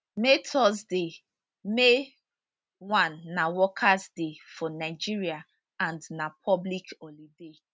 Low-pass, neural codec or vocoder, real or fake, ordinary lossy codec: none; none; real; none